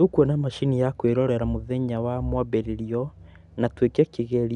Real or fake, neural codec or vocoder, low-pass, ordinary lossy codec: real; none; 10.8 kHz; none